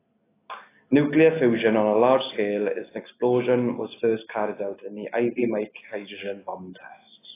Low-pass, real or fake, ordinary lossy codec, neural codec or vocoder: 3.6 kHz; real; AAC, 16 kbps; none